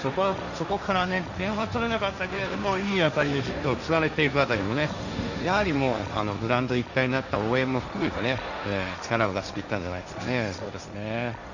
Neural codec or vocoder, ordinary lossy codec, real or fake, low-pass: codec, 16 kHz, 1.1 kbps, Voila-Tokenizer; none; fake; 7.2 kHz